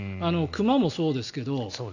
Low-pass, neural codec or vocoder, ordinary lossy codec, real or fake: 7.2 kHz; none; none; real